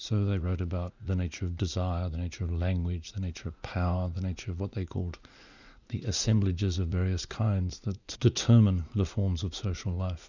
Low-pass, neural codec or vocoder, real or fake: 7.2 kHz; vocoder, 44.1 kHz, 80 mel bands, Vocos; fake